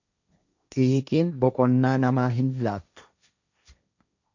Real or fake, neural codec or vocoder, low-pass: fake; codec, 16 kHz, 1.1 kbps, Voila-Tokenizer; 7.2 kHz